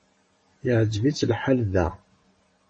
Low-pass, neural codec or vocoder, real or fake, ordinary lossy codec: 10.8 kHz; vocoder, 44.1 kHz, 128 mel bands every 512 samples, BigVGAN v2; fake; MP3, 32 kbps